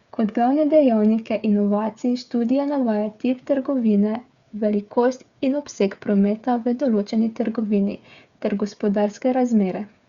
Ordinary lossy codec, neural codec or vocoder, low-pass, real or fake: Opus, 64 kbps; codec, 16 kHz, 8 kbps, FreqCodec, smaller model; 7.2 kHz; fake